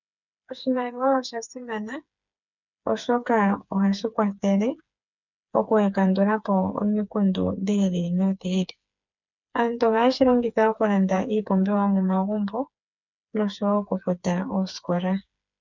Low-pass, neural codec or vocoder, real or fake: 7.2 kHz; codec, 16 kHz, 4 kbps, FreqCodec, smaller model; fake